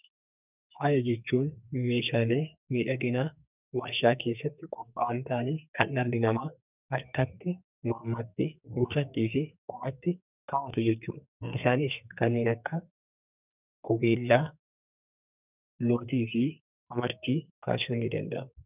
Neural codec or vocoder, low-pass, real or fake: codec, 44.1 kHz, 2.6 kbps, SNAC; 3.6 kHz; fake